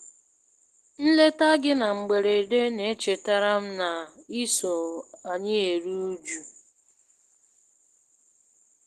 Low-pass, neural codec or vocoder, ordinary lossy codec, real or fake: 14.4 kHz; none; Opus, 16 kbps; real